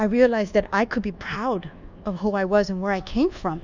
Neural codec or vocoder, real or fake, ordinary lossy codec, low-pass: codec, 24 kHz, 1.2 kbps, DualCodec; fake; Opus, 64 kbps; 7.2 kHz